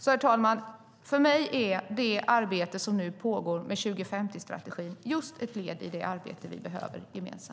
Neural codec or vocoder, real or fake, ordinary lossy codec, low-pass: none; real; none; none